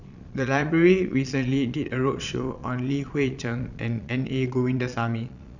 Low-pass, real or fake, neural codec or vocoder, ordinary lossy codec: 7.2 kHz; fake; vocoder, 22.05 kHz, 80 mel bands, Vocos; none